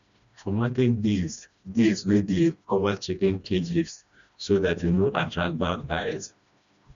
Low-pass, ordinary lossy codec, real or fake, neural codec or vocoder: 7.2 kHz; none; fake; codec, 16 kHz, 1 kbps, FreqCodec, smaller model